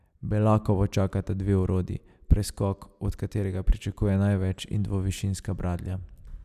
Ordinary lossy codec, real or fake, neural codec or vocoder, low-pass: none; real; none; 14.4 kHz